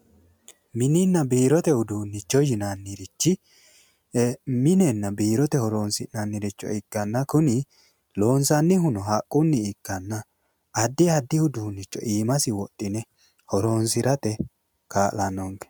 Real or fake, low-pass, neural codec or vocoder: real; 19.8 kHz; none